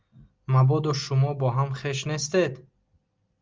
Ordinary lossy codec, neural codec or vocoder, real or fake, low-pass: Opus, 32 kbps; none; real; 7.2 kHz